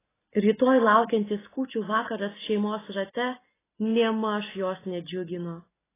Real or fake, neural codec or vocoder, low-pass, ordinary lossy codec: real; none; 3.6 kHz; AAC, 16 kbps